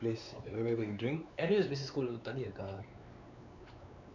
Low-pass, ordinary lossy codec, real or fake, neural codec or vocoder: 7.2 kHz; none; fake; codec, 16 kHz, 4 kbps, X-Codec, WavLM features, trained on Multilingual LibriSpeech